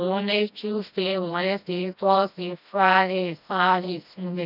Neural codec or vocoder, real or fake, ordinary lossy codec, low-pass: codec, 16 kHz, 1 kbps, FreqCodec, smaller model; fake; none; 5.4 kHz